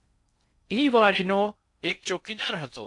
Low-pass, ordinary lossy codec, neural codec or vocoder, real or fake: 10.8 kHz; AAC, 64 kbps; codec, 16 kHz in and 24 kHz out, 0.6 kbps, FocalCodec, streaming, 4096 codes; fake